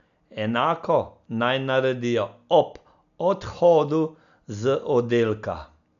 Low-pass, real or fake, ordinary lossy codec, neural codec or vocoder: 7.2 kHz; real; none; none